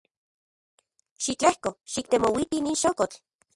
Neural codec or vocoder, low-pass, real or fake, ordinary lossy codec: none; 10.8 kHz; real; Opus, 64 kbps